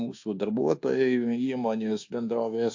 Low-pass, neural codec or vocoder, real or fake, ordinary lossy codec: 7.2 kHz; codec, 24 kHz, 1.2 kbps, DualCodec; fake; AAC, 48 kbps